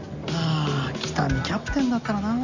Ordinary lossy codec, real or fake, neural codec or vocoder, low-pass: none; real; none; 7.2 kHz